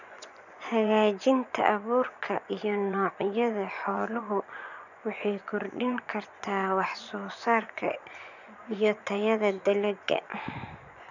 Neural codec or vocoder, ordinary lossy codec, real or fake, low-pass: vocoder, 24 kHz, 100 mel bands, Vocos; none; fake; 7.2 kHz